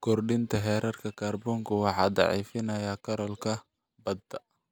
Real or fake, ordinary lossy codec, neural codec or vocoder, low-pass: real; none; none; none